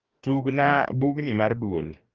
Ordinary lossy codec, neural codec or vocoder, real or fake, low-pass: Opus, 32 kbps; codec, 44.1 kHz, 2.6 kbps, DAC; fake; 7.2 kHz